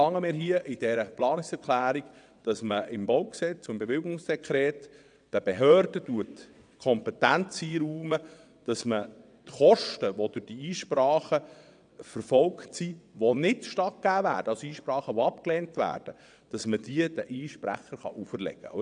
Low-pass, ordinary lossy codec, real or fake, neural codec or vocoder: 9.9 kHz; none; fake; vocoder, 22.05 kHz, 80 mel bands, WaveNeXt